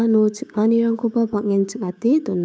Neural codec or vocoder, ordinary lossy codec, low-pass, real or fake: codec, 16 kHz, 6 kbps, DAC; none; none; fake